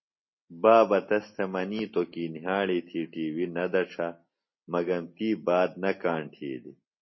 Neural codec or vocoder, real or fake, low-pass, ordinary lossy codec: none; real; 7.2 kHz; MP3, 24 kbps